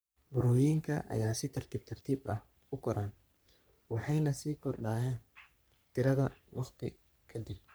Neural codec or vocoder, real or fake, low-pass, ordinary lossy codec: codec, 44.1 kHz, 3.4 kbps, Pupu-Codec; fake; none; none